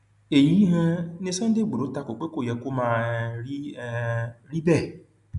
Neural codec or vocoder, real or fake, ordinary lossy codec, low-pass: none; real; none; 10.8 kHz